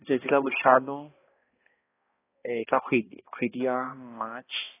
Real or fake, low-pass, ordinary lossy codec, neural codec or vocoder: fake; 3.6 kHz; AAC, 16 kbps; codec, 16 kHz, 1 kbps, X-Codec, HuBERT features, trained on balanced general audio